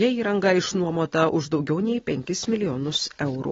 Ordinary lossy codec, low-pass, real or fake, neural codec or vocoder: AAC, 24 kbps; 7.2 kHz; real; none